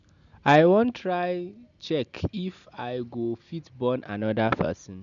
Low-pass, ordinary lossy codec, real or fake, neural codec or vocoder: 7.2 kHz; none; real; none